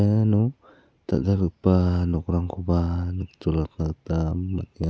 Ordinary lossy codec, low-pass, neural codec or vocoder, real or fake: none; none; none; real